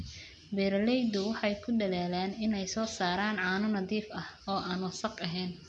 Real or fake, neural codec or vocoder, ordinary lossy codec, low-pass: real; none; none; 10.8 kHz